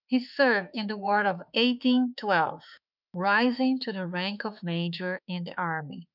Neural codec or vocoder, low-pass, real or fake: autoencoder, 48 kHz, 32 numbers a frame, DAC-VAE, trained on Japanese speech; 5.4 kHz; fake